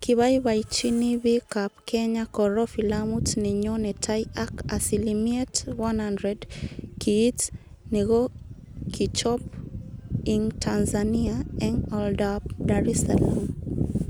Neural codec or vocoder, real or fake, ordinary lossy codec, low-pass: none; real; none; none